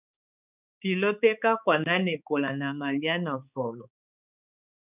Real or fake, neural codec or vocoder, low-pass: fake; codec, 16 kHz, 4 kbps, X-Codec, HuBERT features, trained on balanced general audio; 3.6 kHz